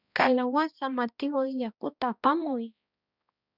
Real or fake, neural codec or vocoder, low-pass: fake; codec, 16 kHz, 1 kbps, X-Codec, HuBERT features, trained on balanced general audio; 5.4 kHz